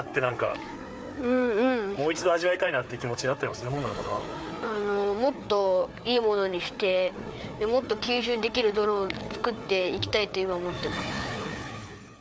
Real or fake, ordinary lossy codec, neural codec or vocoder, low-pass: fake; none; codec, 16 kHz, 4 kbps, FreqCodec, larger model; none